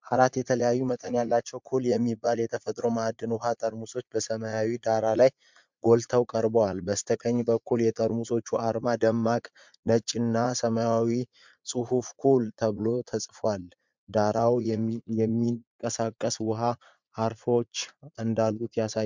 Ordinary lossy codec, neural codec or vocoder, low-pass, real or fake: MP3, 64 kbps; vocoder, 44.1 kHz, 80 mel bands, Vocos; 7.2 kHz; fake